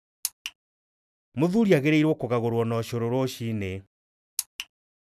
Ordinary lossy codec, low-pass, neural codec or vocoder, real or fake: none; 14.4 kHz; none; real